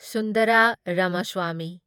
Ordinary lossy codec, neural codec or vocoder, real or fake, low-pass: none; vocoder, 48 kHz, 128 mel bands, Vocos; fake; 19.8 kHz